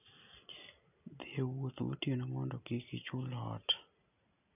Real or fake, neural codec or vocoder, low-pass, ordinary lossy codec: real; none; 3.6 kHz; none